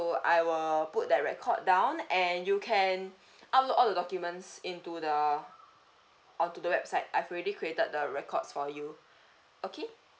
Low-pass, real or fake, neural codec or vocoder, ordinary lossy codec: none; real; none; none